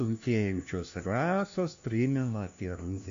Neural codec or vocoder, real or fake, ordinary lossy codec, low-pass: codec, 16 kHz, 1 kbps, FunCodec, trained on LibriTTS, 50 frames a second; fake; MP3, 48 kbps; 7.2 kHz